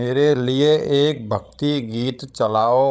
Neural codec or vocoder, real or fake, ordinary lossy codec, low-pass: codec, 16 kHz, 16 kbps, FreqCodec, larger model; fake; none; none